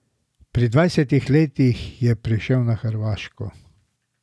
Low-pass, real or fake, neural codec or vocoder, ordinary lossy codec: none; real; none; none